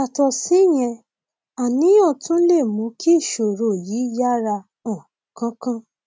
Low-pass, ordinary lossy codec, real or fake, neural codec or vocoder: none; none; real; none